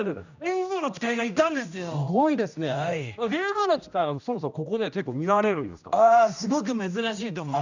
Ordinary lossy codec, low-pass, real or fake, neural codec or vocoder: none; 7.2 kHz; fake; codec, 16 kHz, 1 kbps, X-Codec, HuBERT features, trained on general audio